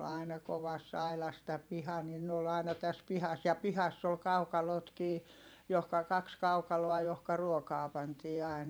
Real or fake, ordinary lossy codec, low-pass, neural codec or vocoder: fake; none; none; vocoder, 44.1 kHz, 128 mel bands every 512 samples, BigVGAN v2